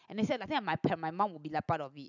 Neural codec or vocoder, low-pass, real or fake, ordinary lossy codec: none; 7.2 kHz; real; none